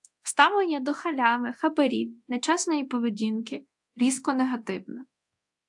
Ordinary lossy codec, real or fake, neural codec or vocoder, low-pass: AAC, 64 kbps; fake; codec, 24 kHz, 0.9 kbps, DualCodec; 10.8 kHz